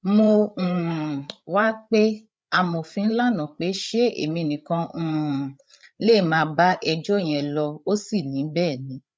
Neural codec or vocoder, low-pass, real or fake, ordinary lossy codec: codec, 16 kHz, 8 kbps, FreqCodec, larger model; none; fake; none